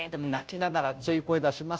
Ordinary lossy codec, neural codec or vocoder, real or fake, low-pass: none; codec, 16 kHz, 0.5 kbps, FunCodec, trained on Chinese and English, 25 frames a second; fake; none